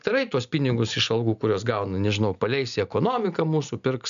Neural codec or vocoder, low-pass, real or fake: none; 7.2 kHz; real